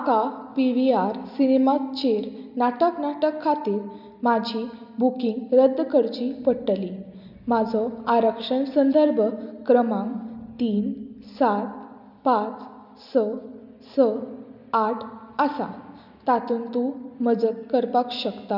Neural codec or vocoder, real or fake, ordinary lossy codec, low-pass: none; real; none; 5.4 kHz